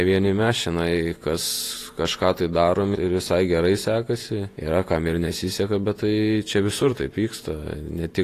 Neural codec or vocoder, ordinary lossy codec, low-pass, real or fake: vocoder, 48 kHz, 128 mel bands, Vocos; AAC, 48 kbps; 14.4 kHz; fake